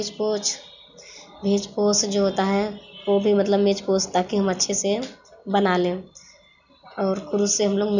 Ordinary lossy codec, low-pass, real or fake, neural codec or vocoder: none; 7.2 kHz; real; none